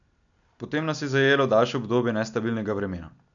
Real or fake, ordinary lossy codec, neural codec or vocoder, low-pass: real; none; none; 7.2 kHz